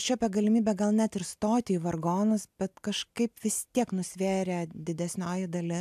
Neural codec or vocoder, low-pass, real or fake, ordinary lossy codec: none; 14.4 kHz; real; AAC, 96 kbps